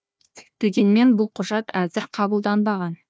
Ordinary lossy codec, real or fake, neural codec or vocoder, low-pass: none; fake; codec, 16 kHz, 1 kbps, FunCodec, trained on Chinese and English, 50 frames a second; none